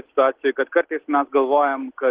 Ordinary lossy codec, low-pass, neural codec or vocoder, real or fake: Opus, 16 kbps; 3.6 kHz; none; real